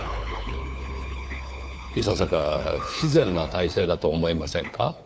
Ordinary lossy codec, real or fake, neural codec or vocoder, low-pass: none; fake; codec, 16 kHz, 4 kbps, FunCodec, trained on LibriTTS, 50 frames a second; none